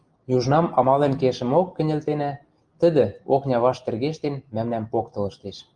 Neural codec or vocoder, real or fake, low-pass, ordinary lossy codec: none; real; 9.9 kHz; Opus, 24 kbps